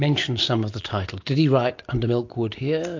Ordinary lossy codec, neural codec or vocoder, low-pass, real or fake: MP3, 48 kbps; none; 7.2 kHz; real